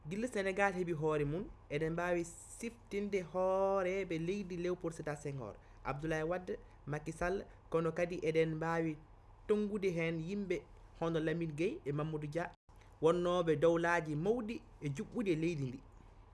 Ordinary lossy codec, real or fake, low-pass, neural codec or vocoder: none; real; none; none